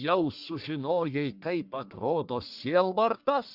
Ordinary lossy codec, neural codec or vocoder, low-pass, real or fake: Opus, 64 kbps; codec, 44.1 kHz, 1.7 kbps, Pupu-Codec; 5.4 kHz; fake